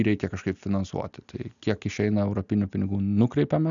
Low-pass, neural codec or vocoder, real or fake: 7.2 kHz; none; real